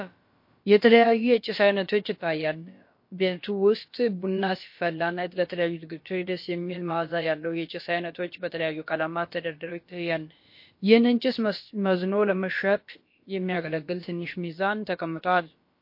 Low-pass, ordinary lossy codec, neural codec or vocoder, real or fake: 5.4 kHz; MP3, 32 kbps; codec, 16 kHz, about 1 kbps, DyCAST, with the encoder's durations; fake